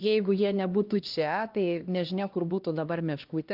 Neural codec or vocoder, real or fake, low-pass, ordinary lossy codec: codec, 16 kHz, 1 kbps, X-Codec, HuBERT features, trained on LibriSpeech; fake; 5.4 kHz; Opus, 32 kbps